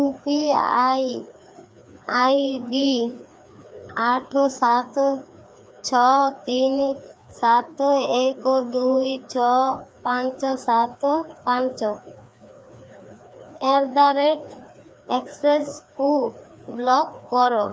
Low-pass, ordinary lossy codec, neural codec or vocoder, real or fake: none; none; codec, 16 kHz, 2 kbps, FreqCodec, larger model; fake